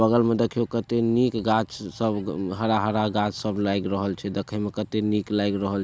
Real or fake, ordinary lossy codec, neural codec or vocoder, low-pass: real; none; none; none